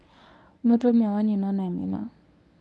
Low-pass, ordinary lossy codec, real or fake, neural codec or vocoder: none; none; fake; codec, 24 kHz, 0.9 kbps, WavTokenizer, medium speech release version 1